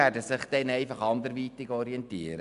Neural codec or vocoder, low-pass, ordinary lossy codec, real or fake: none; 10.8 kHz; MP3, 96 kbps; real